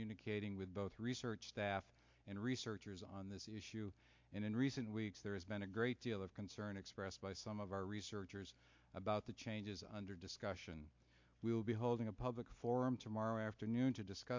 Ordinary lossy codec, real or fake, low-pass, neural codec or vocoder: MP3, 48 kbps; real; 7.2 kHz; none